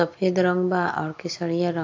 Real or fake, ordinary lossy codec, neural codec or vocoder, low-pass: real; AAC, 48 kbps; none; 7.2 kHz